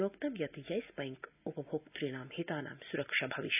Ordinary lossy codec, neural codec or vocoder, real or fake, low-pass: none; none; real; 3.6 kHz